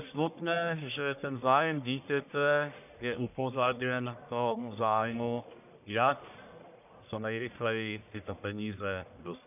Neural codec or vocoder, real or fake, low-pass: codec, 44.1 kHz, 1.7 kbps, Pupu-Codec; fake; 3.6 kHz